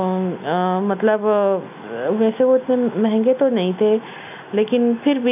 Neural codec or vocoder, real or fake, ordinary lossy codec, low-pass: none; real; none; 3.6 kHz